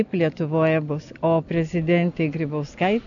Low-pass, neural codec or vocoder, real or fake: 7.2 kHz; none; real